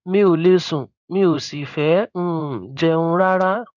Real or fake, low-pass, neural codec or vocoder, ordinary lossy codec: fake; 7.2 kHz; codec, 16 kHz in and 24 kHz out, 1 kbps, XY-Tokenizer; none